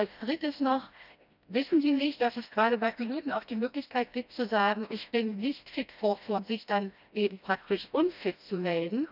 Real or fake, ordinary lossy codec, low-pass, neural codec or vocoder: fake; none; 5.4 kHz; codec, 16 kHz, 1 kbps, FreqCodec, smaller model